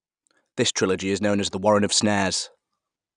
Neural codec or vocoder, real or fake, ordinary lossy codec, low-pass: none; real; none; 9.9 kHz